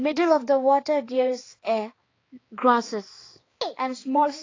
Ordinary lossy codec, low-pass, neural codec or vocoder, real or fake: AAC, 32 kbps; 7.2 kHz; codec, 16 kHz, 4 kbps, X-Codec, HuBERT features, trained on balanced general audio; fake